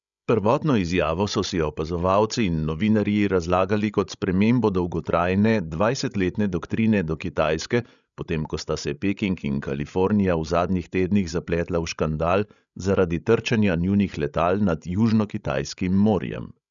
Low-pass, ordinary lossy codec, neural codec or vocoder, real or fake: 7.2 kHz; none; codec, 16 kHz, 16 kbps, FreqCodec, larger model; fake